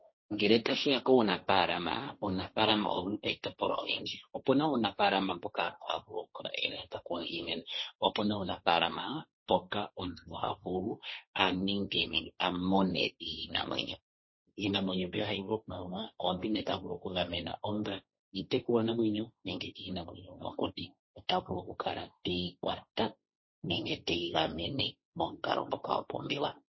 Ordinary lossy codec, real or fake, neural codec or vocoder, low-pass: MP3, 24 kbps; fake; codec, 16 kHz, 1.1 kbps, Voila-Tokenizer; 7.2 kHz